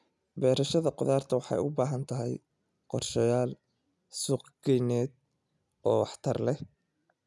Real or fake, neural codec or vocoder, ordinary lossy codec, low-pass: real; none; none; none